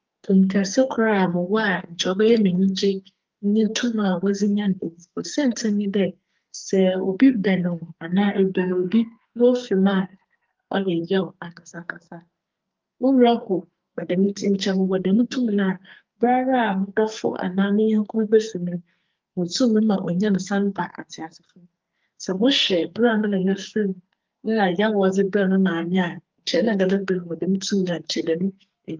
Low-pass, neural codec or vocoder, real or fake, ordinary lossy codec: 7.2 kHz; codec, 44.1 kHz, 2.6 kbps, SNAC; fake; Opus, 24 kbps